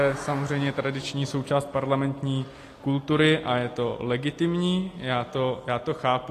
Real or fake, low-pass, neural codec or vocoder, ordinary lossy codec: real; 14.4 kHz; none; AAC, 48 kbps